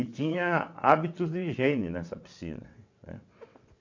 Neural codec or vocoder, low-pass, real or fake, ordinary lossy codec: vocoder, 22.05 kHz, 80 mel bands, WaveNeXt; 7.2 kHz; fake; MP3, 64 kbps